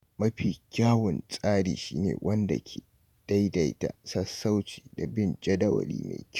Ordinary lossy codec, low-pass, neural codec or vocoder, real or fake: none; 19.8 kHz; vocoder, 48 kHz, 128 mel bands, Vocos; fake